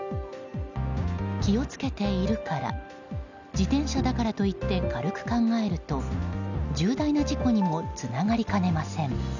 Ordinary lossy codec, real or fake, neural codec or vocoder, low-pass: none; real; none; 7.2 kHz